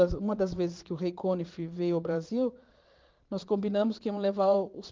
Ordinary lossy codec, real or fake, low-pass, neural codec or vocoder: Opus, 32 kbps; fake; 7.2 kHz; vocoder, 22.05 kHz, 80 mel bands, Vocos